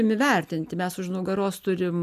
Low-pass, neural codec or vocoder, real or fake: 14.4 kHz; none; real